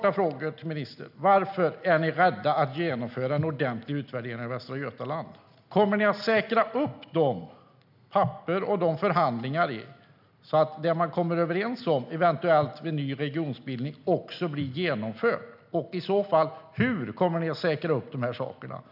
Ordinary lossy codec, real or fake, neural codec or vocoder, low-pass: none; real; none; 5.4 kHz